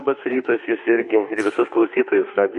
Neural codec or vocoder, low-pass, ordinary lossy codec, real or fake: autoencoder, 48 kHz, 32 numbers a frame, DAC-VAE, trained on Japanese speech; 14.4 kHz; MP3, 48 kbps; fake